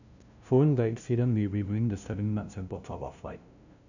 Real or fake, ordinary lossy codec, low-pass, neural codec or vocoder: fake; none; 7.2 kHz; codec, 16 kHz, 0.5 kbps, FunCodec, trained on LibriTTS, 25 frames a second